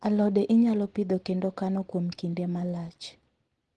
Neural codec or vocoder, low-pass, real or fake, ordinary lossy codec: none; 10.8 kHz; real; Opus, 16 kbps